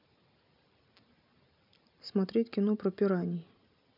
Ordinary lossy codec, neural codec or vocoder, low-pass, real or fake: none; none; 5.4 kHz; real